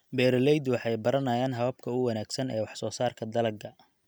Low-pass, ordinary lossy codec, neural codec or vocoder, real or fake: none; none; none; real